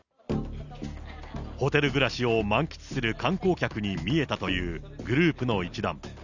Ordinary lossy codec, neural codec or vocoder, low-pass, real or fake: none; none; 7.2 kHz; real